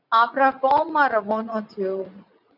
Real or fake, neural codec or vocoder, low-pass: fake; vocoder, 44.1 kHz, 80 mel bands, Vocos; 5.4 kHz